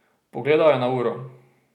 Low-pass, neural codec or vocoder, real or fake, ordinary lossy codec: 19.8 kHz; none; real; none